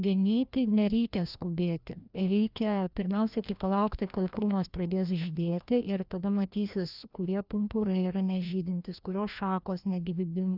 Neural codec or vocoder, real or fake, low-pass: codec, 16 kHz, 1 kbps, FreqCodec, larger model; fake; 5.4 kHz